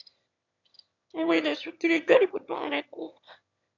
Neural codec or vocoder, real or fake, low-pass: autoencoder, 22.05 kHz, a latent of 192 numbers a frame, VITS, trained on one speaker; fake; 7.2 kHz